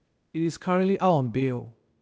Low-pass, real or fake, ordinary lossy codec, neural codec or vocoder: none; fake; none; codec, 16 kHz, 0.8 kbps, ZipCodec